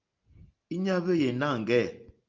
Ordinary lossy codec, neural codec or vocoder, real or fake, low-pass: Opus, 24 kbps; none; real; 7.2 kHz